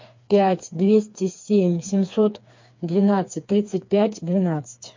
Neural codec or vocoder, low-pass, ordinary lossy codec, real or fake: codec, 16 kHz, 4 kbps, FreqCodec, smaller model; 7.2 kHz; MP3, 48 kbps; fake